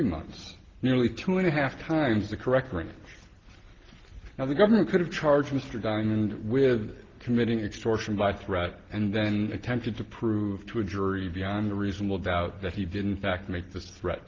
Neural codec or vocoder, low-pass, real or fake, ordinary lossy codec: none; 7.2 kHz; real; Opus, 16 kbps